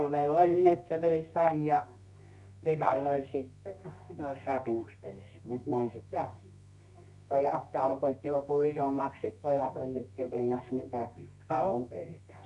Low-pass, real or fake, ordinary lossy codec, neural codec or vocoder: 10.8 kHz; fake; none; codec, 24 kHz, 0.9 kbps, WavTokenizer, medium music audio release